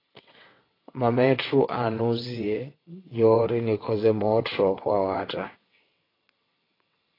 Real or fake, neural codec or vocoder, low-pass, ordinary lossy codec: fake; vocoder, 22.05 kHz, 80 mel bands, WaveNeXt; 5.4 kHz; AAC, 24 kbps